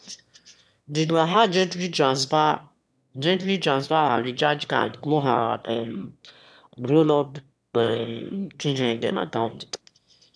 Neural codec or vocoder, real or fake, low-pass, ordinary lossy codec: autoencoder, 22.05 kHz, a latent of 192 numbers a frame, VITS, trained on one speaker; fake; none; none